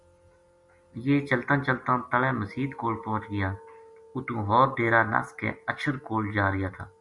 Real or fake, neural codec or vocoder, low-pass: real; none; 10.8 kHz